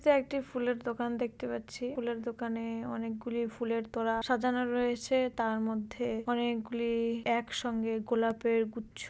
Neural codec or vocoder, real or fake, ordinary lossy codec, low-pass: none; real; none; none